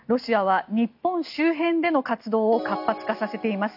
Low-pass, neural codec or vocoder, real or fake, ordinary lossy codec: 5.4 kHz; none; real; none